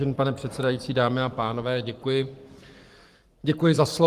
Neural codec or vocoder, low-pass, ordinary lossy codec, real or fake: codec, 44.1 kHz, 7.8 kbps, Pupu-Codec; 14.4 kHz; Opus, 24 kbps; fake